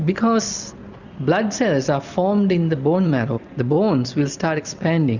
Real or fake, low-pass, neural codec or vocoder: real; 7.2 kHz; none